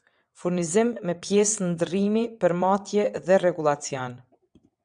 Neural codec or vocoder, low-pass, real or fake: vocoder, 22.05 kHz, 80 mel bands, WaveNeXt; 9.9 kHz; fake